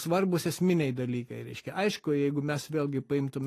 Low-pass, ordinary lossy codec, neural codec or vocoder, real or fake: 14.4 kHz; AAC, 48 kbps; none; real